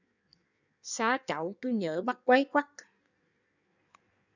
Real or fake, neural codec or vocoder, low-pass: fake; codec, 16 kHz in and 24 kHz out, 1.1 kbps, FireRedTTS-2 codec; 7.2 kHz